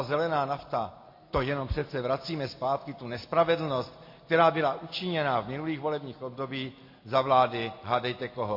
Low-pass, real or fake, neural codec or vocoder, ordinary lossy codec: 5.4 kHz; real; none; MP3, 32 kbps